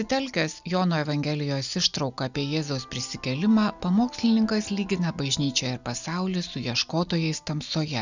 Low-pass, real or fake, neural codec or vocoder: 7.2 kHz; real; none